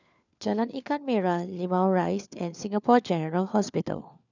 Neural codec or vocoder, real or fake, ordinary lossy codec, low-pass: codec, 16 kHz, 4 kbps, FunCodec, trained on LibriTTS, 50 frames a second; fake; none; 7.2 kHz